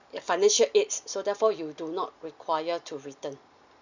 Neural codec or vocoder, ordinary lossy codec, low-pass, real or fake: none; none; 7.2 kHz; real